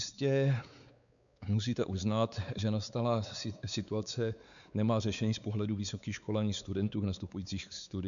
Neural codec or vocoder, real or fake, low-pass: codec, 16 kHz, 4 kbps, X-Codec, WavLM features, trained on Multilingual LibriSpeech; fake; 7.2 kHz